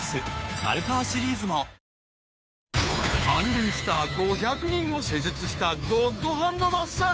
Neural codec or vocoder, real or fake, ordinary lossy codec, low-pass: codec, 16 kHz, 2 kbps, FunCodec, trained on Chinese and English, 25 frames a second; fake; none; none